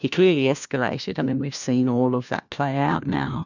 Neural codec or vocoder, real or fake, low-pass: codec, 16 kHz, 1 kbps, FunCodec, trained on LibriTTS, 50 frames a second; fake; 7.2 kHz